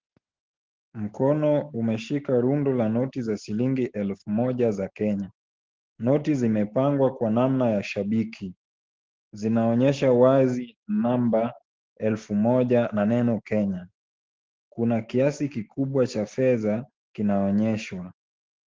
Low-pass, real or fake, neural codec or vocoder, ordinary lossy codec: 7.2 kHz; real; none; Opus, 16 kbps